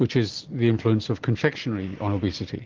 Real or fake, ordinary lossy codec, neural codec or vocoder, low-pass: real; Opus, 16 kbps; none; 7.2 kHz